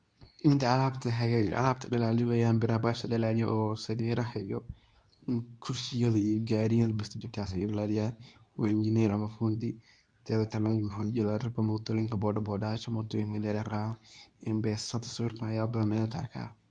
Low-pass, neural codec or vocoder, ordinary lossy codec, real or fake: 9.9 kHz; codec, 24 kHz, 0.9 kbps, WavTokenizer, medium speech release version 2; none; fake